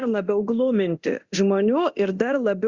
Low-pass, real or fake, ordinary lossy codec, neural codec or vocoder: 7.2 kHz; fake; Opus, 64 kbps; codec, 24 kHz, 0.9 kbps, DualCodec